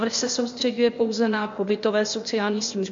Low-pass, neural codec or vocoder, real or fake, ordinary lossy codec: 7.2 kHz; codec, 16 kHz, 0.8 kbps, ZipCodec; fake; MP3, 48 kbps